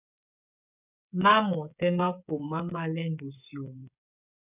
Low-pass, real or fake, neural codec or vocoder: 3.6 kHz; fake; codec, 16 kHz, 8 kbps, FreqCodec, smaller model